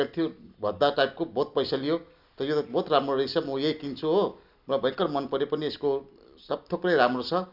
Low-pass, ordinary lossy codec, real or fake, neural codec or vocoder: 5.4 kHz; none; real; none